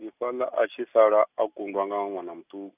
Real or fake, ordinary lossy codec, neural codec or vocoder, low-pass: real; none; none; 3.6 kHz